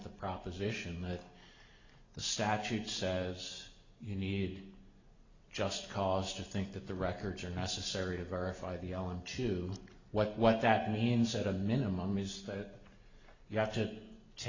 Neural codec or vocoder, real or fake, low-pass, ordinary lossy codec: none; real; 7.2 kHz; Opus, 64 kbps